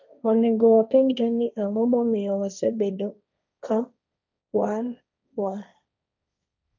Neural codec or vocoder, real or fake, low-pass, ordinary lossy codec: codec, 16 kHz, 1.1 kbps, Voila-Tokenizer; fake; 7.2 kHz; none